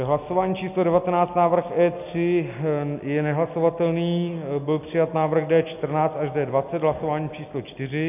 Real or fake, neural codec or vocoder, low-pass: real; none; 3.6 kHz